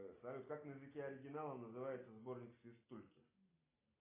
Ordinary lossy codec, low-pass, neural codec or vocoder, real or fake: AAC, 16 kbps; 3.6 kHz; none; real